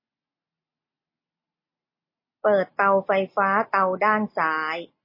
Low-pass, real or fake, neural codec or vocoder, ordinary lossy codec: 5.4 kHz; real; none; MP3, 24 kbps